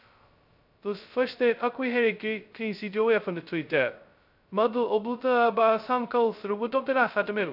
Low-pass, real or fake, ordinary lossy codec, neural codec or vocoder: 5.4 kHz; fake; none; codec, 16 kHz, 0.2 kbps, FocalCodec